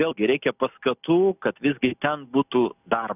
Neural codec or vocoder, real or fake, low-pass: none; real; 3.6 kHz